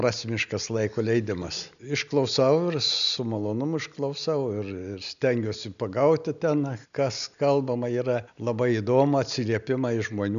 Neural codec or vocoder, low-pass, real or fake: none; 7.2 kHz; real